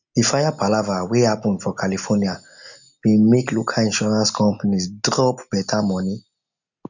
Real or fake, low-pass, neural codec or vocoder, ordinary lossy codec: real; 7.2 kHz; none; none